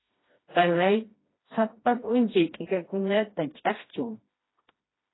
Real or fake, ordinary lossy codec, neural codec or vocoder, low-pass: fake; AAC, 16 kbps; codec, 16 kHz, 1 kbps, FreqCodec, smaller model; 7.2 kHz